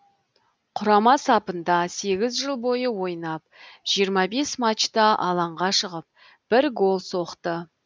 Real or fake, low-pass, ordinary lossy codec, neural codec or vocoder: real; none; none; none